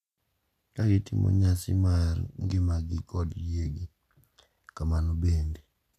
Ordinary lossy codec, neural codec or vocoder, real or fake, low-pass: none; none; real; 14.4 kHz